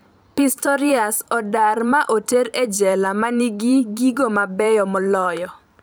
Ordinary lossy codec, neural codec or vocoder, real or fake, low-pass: none; vocoder, 44.1 kHz, 128 mel bands every 512 samples, BigVGAN v2; fake; none